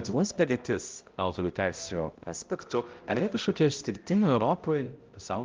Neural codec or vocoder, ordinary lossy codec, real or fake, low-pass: codec, 16 kHz, 0.5 kbps, X-Codec, HuBERT features, trained on balanced general audio; Opus, 32 kbps; fake; 7.2 kHz